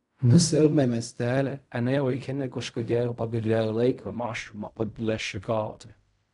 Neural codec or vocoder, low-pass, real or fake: codec, 16 kHz in and 24 kHz out, 0.4 kbps, LongCat-Audio-Codec, fine tuned four codebook decoder; 10.8 kHz; fake